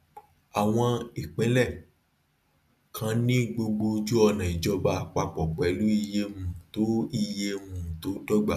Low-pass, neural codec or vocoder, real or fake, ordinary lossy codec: 14.4 kHz; none; real; none